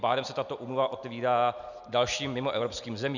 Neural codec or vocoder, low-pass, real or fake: none; 7.2 kHz; real